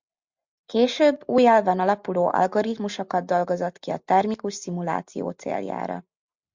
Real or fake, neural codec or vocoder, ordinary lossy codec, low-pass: real; none; MP3, 64 kbps; 7.2 kHz